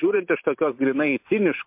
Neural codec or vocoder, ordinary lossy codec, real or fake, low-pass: vocoder, 44.1 kHz, 128 mel bands every 512 samples, BigVGAN v2; MP3, 32 kbps; fake; 3.6 kHz